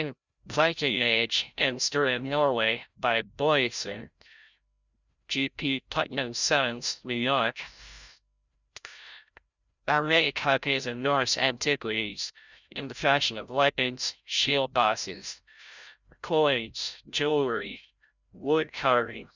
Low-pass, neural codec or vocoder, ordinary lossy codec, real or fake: 7.2 kHz; codec, 16 kHz, 0.5 kbps, FreqCodec, larger model; Opus, 64 kbps; fake